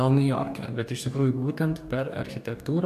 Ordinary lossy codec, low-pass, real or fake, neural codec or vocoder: AAC, 96 kbps; 14.4 kHz; fake; codec, 44.1 kHz, 2.6 kbps, DAC